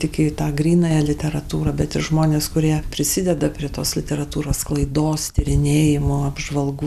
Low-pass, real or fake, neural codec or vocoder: 14.4 kHz; real; none